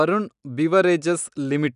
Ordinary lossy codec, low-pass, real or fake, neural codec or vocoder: none; 10.8 kHz; real; none